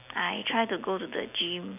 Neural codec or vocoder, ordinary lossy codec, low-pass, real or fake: none; none; 3.6 kHz; real